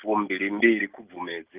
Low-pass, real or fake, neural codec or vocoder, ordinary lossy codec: 3.6 kHz; real; none; Opus, 24 kbps